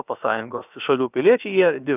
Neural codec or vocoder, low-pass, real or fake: codec, 16 kHz, about 1 kbps, DyCAST, with the encoder's durations; 3.6 kHz; fake